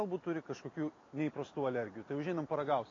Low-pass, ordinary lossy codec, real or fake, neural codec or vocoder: 7.2 kHz; AAC, 32 kbps; real; none